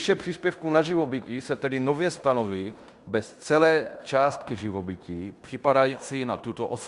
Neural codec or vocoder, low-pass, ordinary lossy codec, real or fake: codec, 16 kHz in and 24 kHz out, 0.9 kbps, LongCat-Audio-Codec, fine tuned four codebook decoder; 10.8 kHz; Opus, 64 kbps; fake